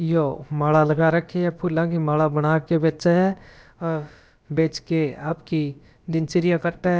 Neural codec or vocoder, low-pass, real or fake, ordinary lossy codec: codec, 16 kHz, about 1 kbps, DyCAST, with the encoder's durations; none; fake; none